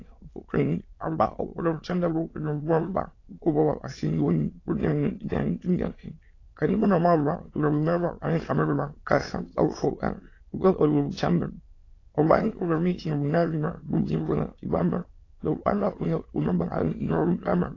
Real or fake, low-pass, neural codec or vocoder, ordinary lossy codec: fake; 7.2 kHz; autoencoder, 22.05 kHz, a latent of 192 numbers a frame, VITS, trained on many speakers; AAC, 32 kbps